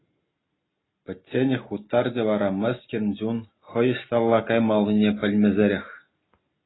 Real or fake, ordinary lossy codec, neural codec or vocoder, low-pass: real; AAC, 16 kbps; none; 7.2 kHz